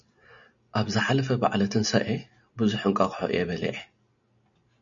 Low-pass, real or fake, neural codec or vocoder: 7.2 kHz; real; none